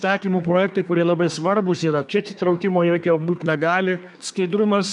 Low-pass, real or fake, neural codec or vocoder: 10.8 kHz; fake; codec, 24 kHz, 1 kbps, SNAC